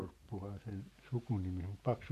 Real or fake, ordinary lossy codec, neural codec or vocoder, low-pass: real; MP3, 64 kbps; none; 14.4 kHz